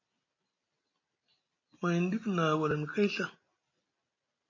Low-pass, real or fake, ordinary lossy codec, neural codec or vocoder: 7.2 kHz; real; MP3, 32 kbps; none